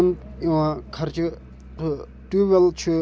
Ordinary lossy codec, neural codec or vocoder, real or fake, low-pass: none; none; real; none